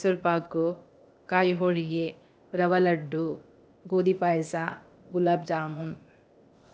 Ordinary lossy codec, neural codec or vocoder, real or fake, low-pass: none; codec, 16 kHz, 0.8 kbps, ZipCodec; fake; none